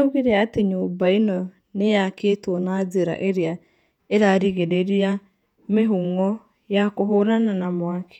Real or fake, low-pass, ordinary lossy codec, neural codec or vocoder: fake; 19.8 kHz; none; vocoder, 48 kHz, 128 mel bands, Vocos